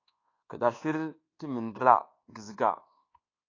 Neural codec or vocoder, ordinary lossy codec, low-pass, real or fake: codec, 24 kHz, 1.2 kbps, DualCodec; AAC, 32 kbps; 7.2 kHz; fake